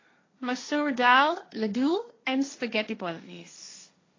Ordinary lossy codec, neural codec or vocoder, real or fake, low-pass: AAC, 32 kbps; codec, 16 kHz, 1.1 kbps, Voila-Tokenizer; fake; 7.2 kHz